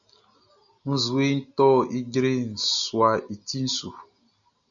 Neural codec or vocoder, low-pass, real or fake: none; 7.2 kHz; real